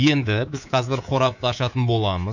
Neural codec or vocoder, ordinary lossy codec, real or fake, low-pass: codec, 24 kHz, 3.1 kbps, DualCodec; none; fake; 7.2 kHz